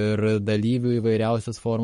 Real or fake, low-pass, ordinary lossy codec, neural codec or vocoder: fake; 19.8 kHz; MP3, 48 kbps; codec, 44.1 kHz, 7.8 kbps, Pupu-Codec